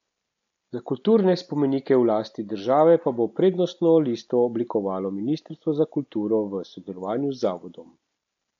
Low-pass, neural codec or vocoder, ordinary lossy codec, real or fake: 7.2 kHz; none; MP3, 64 kbps; real